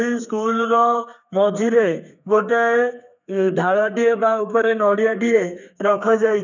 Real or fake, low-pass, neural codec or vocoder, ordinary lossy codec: fake; 7.2 kHz; codec, 32 kHz, 1.9 kbps, SNAC; none